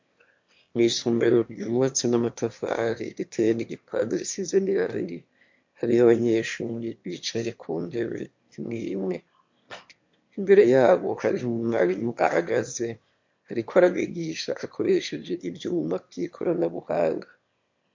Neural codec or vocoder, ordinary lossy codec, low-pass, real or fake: autoencoder, 22.05 kHz, a latent of 192 numbers a frame, VITS, trained on one speaker; MP3, 48 kbps; 7.2 kHz; fake